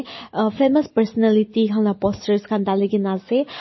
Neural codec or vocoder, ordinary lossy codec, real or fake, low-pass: none; MP3, 24 kbps; real; 7.2 kHz